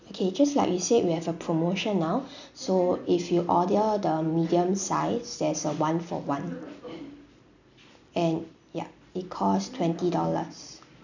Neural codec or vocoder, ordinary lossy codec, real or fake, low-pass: none; none; real; 7.2 kHz